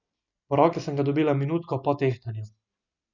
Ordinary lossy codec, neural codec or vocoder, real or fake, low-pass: none; none; real; 7.2 kHz